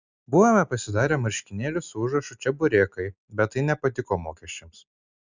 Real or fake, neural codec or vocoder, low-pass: real; none; 7.2 kHz